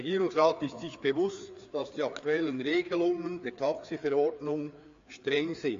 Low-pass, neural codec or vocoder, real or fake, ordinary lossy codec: 7.2 kHz; codec, 16 kHz, 4 kbps, FreqCodec, larger model; fake; AAC, 48 kbps